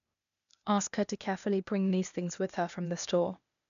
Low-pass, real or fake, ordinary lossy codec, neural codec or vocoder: 7.2 kHz; fake; none; codec, 16 kHz, 0.8 kbps, ZipCodec